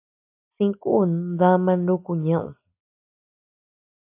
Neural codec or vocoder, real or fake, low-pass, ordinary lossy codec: none; real; 3.6 kHz; AAC, 32 kbps